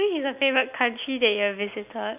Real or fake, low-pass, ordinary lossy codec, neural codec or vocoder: real; 3.6 kHz; none; none